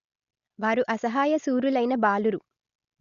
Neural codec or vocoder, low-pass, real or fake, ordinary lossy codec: none; 7.2 kHz; real; Opus, 64 kbps